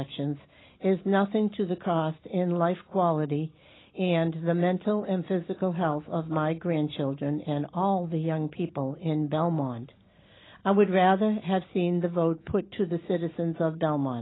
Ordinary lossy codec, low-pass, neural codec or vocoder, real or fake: AAC, 16 kbps; 7.2 kHz; none; real